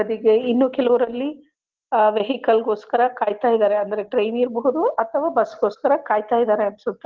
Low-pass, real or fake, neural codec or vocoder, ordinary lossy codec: 7.2 kHz; real; none; Opus, 16 kbps